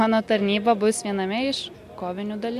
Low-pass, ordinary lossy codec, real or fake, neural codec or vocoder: 14.4 kHz; MP3, 64 kbps; real; none